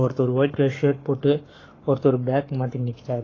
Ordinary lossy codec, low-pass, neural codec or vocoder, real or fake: AAC, 32 kbps; 7.2 kHz; codec, 44.1 kHz, 7.8 kbps, Pupu-Codec; fake